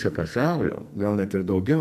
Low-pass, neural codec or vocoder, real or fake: 14.4 kHz; codec, 44.1 kHz, 2.6 kbps, SNAC; fake